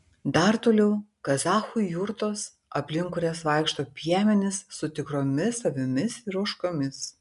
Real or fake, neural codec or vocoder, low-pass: real; none; 10.8 kHz